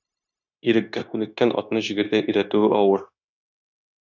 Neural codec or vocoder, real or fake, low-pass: codec, 16 kHz, 0.9 kbps, LongCat-Audio-Codec; fake; 7.2 kHz